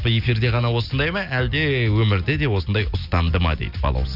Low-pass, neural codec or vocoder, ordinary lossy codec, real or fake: 5.4 kHz; none; none; real